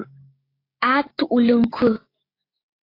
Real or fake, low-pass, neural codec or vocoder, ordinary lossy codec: fake; 5.4 kHz; codec, 16 kHz, 6 kbps, DAC; AAC, 24 kbps